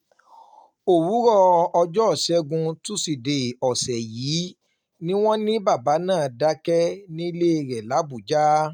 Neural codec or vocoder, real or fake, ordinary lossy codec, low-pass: none; real; none; 19.8 kHz